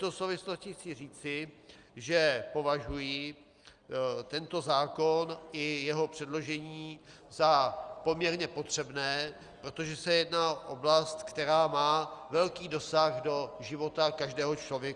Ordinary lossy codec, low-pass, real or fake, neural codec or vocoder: Opus, 32 kbps; 9.9 kHz; real; none